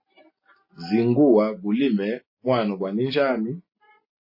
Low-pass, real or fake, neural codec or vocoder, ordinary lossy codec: 5.4 kHz; real; none; MP3, 24 kbps